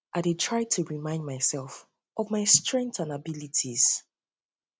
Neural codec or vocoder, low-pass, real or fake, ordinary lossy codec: none; none; real; none